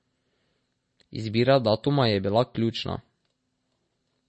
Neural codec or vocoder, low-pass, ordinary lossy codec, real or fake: none; 10.8 kHz; MP3, 32 kbps; real